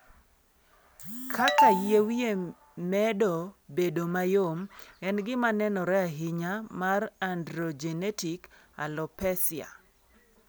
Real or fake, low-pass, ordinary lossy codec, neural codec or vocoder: real; none; none; none